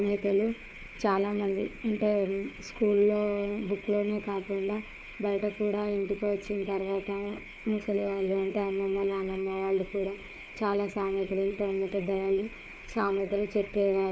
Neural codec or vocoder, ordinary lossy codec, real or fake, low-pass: codec, 16 kHz, 4 kbps, FunCodec, trained on Chinese and English, 50 frames a second; none; fake; none